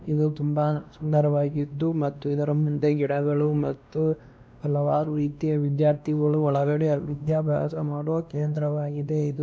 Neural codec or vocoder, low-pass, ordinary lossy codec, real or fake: codec, 16 kHz, 1 kbps, X-Codec, WavLM features, trained on Multilingual LibriSpeech; none; none; fake